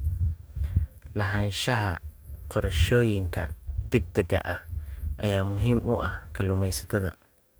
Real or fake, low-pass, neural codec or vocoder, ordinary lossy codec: fake; none; codec, 44.1 kHz, 2.6 kbps, DAC; none